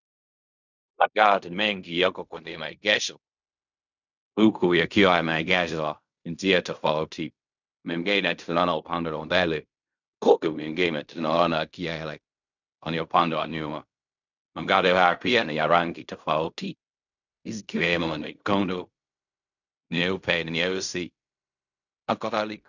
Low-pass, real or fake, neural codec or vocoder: 7.2 kHz; fake; codec, 16 kHz in and 24 kHz out, 0.4 kbps, LongCat-Audio-Codec, fine tuned four codebook decoder